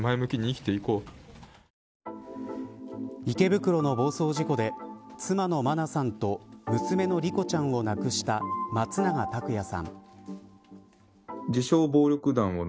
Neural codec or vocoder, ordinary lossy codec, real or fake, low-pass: none; none; real; none